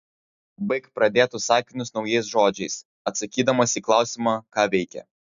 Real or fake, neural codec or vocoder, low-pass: real; none; 7.2 kHz